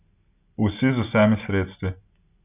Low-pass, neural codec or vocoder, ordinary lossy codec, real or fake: 3.6 kHz; none; none; real